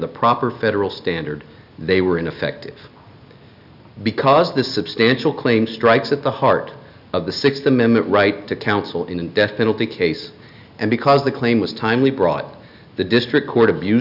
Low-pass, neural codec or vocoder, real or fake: 5.4 kHz; none; real